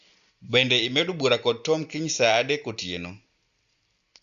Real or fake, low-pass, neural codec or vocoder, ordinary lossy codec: real; 7.2 kHz; none; Opus, 64 kbps